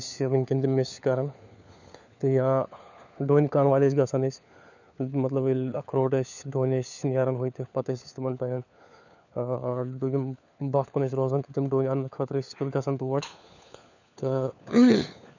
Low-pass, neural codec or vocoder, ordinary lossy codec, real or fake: 7.2 kHz; codec, 16 kHz, 4 kbps, FunCodec, trained on LibriTTS, 50 frames a second; none; fake